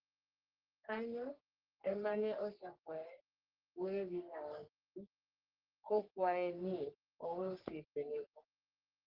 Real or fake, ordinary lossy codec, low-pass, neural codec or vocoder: fake; Opus, 16 kbps; 5.4 kHz; codec, 44.1 kHz, 3.4 kbps, Pupu-Codec